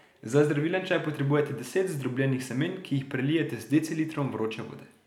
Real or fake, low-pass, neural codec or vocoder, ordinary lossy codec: real; 19.8 kHz; none; none